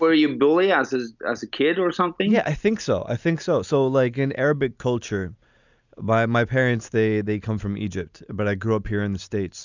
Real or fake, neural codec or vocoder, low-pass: real; none; 7.2 kHz